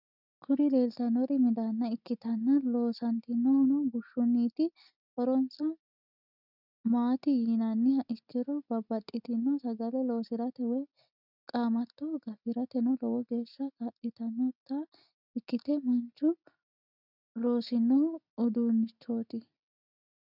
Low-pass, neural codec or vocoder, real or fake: 5.4 kHz; none; real